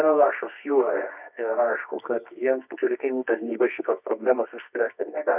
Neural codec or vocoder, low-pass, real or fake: codec, 24 kHz, 0.9 kbps, WavTokenizer, medium music audio release; 3.6 kHz; fake